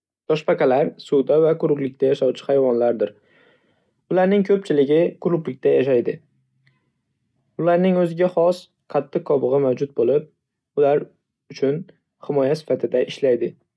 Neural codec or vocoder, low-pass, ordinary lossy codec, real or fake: none; none; none; real